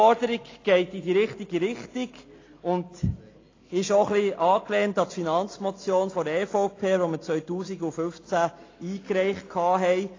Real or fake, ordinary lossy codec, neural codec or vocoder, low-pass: real; AAC, 32 kbps; none; 7.2 kHz